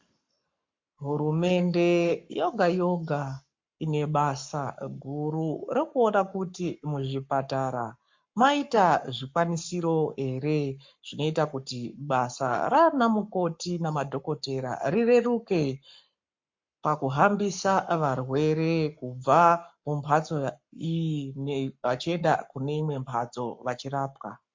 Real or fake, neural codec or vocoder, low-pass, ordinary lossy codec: fake; codec, 44.1 kHz, 7.8 kbps, Pupu-Codec; 7.2 kHz; MP3, 48 kbps